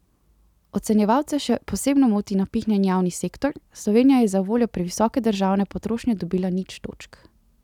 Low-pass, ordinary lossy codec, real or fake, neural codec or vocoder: 19.8 kHz; none; real; none